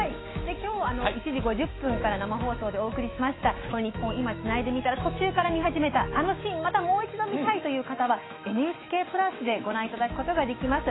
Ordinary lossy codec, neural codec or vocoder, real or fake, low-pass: AAC, 16 kbps; autoencoder, 48 kHz, 128 numbers a frame, DAC-VAE, trained on Japanese speech; fake; 7.2 kHz